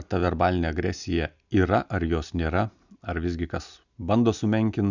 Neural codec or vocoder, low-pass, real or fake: none; 7.2 kHz; real